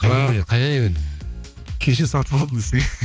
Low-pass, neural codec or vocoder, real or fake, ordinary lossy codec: none; codec, 16 kHz, 2 kbps, X-Codec, HuBERT features, trained on balanced general audio; fake; none